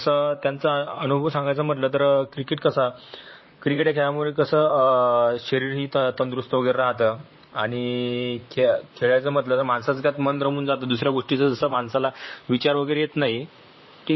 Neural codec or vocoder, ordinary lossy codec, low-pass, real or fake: vocoder, 44.1 kHz, 128 mel bands, Pupu-Vocoder; MP3, 24 kbps; 7.2 kHz; fake